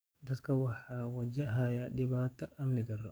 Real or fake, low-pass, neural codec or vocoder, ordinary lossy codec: fake; none; codec, 44.1 kHz, 7.8 kbps, DAC; none